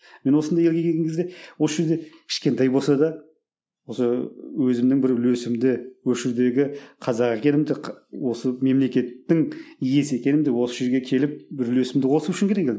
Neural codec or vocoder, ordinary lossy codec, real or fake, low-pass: none; none; real; none